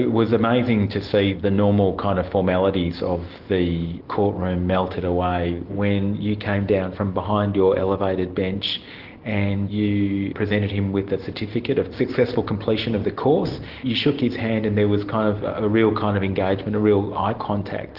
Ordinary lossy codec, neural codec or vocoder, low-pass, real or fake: Opus, 16 kbps; none; 5.4 kHz; real